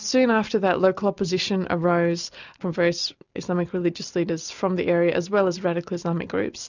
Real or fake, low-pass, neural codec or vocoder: real; 7.2 kHz; none